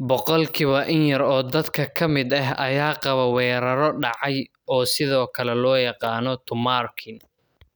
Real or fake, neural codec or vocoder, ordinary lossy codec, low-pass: real; none; none; none